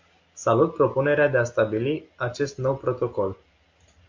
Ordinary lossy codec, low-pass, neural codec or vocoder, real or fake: MP3, 48 kbps; 7.2 kHz; none; real